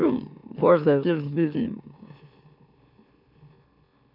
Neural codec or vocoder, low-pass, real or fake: autoencoder, 44.1 kHz, a latent of 192 numbers a frame, MeloTTS; 5.4 kHz; fake